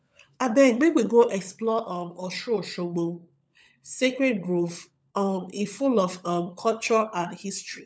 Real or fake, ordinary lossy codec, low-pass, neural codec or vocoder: fake; none; none; codec, 16 kHz, 16 kbps, FunCodec, trained on LibriTTS, 50 frames a second